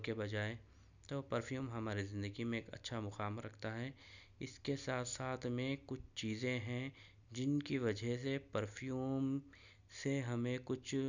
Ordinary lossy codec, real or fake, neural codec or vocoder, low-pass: none; real; none; 7.2 kHz